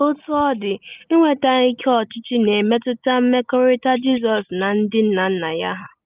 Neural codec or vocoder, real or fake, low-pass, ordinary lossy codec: none; real; 3.6 kHz; Opus, 32 kbps